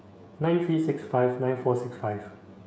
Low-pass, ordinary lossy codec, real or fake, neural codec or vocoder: none; none; fake; codec, 16 kHz, 16 kbps, FreqCodec, smaller model